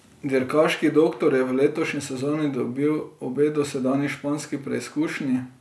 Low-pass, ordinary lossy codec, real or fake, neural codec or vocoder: none; none; real; none